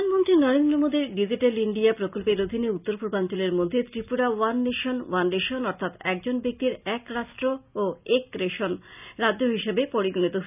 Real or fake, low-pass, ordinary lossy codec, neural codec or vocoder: real; 3.6 kHz; none; none